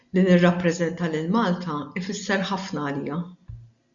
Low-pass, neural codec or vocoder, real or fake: 7.2 kHz; none; real